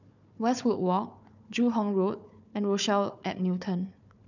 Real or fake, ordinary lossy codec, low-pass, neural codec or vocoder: fake; none; 7.2 kHz; codec, 16 kHz, 4 kbps, FunCodec, trained on Chinese and English, 50 frames a second